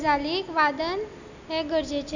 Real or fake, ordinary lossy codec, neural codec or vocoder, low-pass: real; none; none; 7.2 kHz